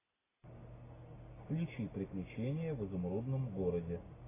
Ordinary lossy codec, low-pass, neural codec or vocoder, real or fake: AAC, 16 kbps; 3.6 kHz; none; real